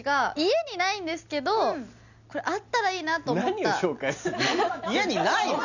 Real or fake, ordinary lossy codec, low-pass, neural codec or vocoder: real; none; 7.2 kHz; none